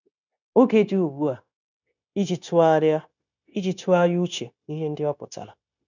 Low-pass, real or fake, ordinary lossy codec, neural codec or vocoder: 7.2 kHz; fake; none; codec, 16 kHz, 0.9 kbps, LongCat-Audio-Codec